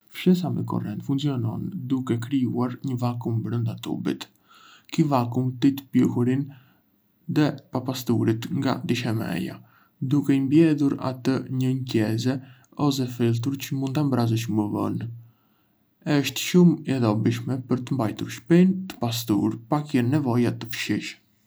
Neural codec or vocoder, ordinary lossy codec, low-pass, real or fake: none; none; none; real